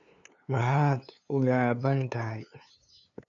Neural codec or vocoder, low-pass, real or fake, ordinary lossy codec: codec, 16 kHz, 2 kbps, FunCodec, trained on LibriTTS, 25 frames a second; 7.2 kHz; fake; none